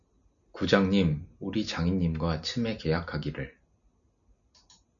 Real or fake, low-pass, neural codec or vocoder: real; 7.2 kHz; none